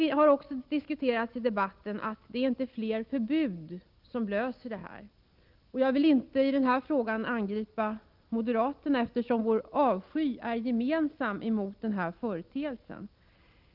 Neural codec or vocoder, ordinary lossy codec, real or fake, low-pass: none; Opus, 24 kbps; real; 5.4 kHz